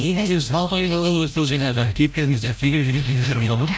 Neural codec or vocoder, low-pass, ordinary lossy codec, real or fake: codec, 16 kHz, 0.5 kbps, FreqCodec, larger model; none; none; fake